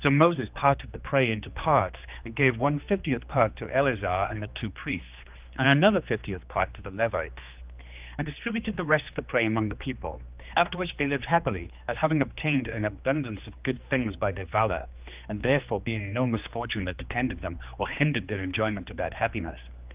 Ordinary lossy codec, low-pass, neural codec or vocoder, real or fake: Opus, 24 kbps; 3.6 kHz; codec, 16 kHz, 2 kbps, X-Codec, HuBERT features, trained on general audio; fake